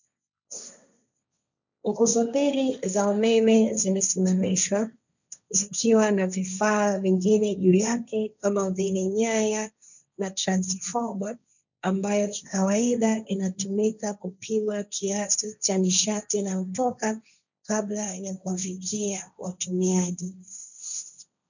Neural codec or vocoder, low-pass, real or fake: codec, 16 kHz, 1.1 kbps, Voila-Tokenizer; 7.2 kHz; fake